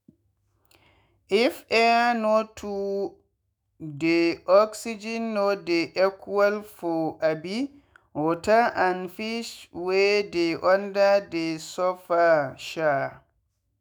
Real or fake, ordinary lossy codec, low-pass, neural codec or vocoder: fake; none; none; autoencoder, 48 kHz, 128 numbers a frame, DAC-VAE, trained on Japanese speech